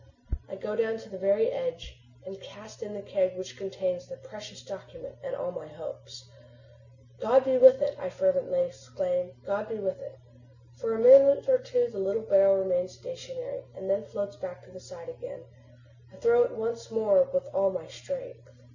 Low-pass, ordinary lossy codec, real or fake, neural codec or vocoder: 7.2 kHz; Opus, 64 kbps; real; none